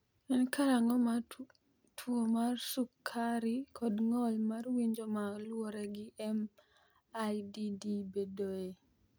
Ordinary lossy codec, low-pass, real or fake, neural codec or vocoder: none; none; real; none